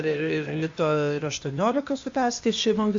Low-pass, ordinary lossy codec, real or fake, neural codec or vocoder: 7.2 kHz; MP3, 48 kbps; fake; codec, 16 kHz, 0.8 kbps, ZipCodec